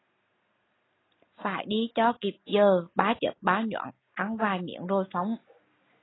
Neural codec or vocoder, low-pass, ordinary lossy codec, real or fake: none; 7.2 kHz; AAC, 16 kbps; real